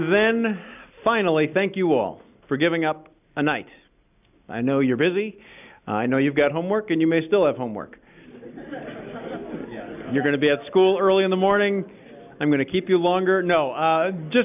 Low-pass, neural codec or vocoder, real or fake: 3.6 kHz; none; real